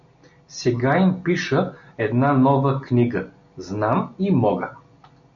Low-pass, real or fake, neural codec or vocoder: 7.2 kHz; real; none